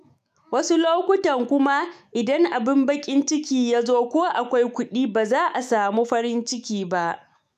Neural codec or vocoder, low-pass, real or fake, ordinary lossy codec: autoencoder, 48 kHz, 128 numbers a frame, DAC-VAE, trained on Japanese speech; 14.4 kHz; fake; MP3, 96 kbps